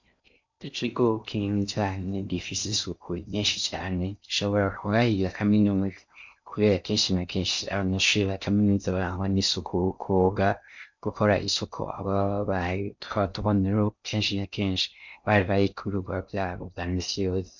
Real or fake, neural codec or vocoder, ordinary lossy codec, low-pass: fake; codec, 16 kHz in and 24 kHz out, 0.6 kbps, FocalCodec, streaming, 4096 codes; MP3, 64 kbps; 7.2 kHz